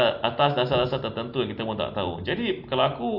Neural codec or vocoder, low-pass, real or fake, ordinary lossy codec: none; 5.4 kHz; real; AAC, 48 kbps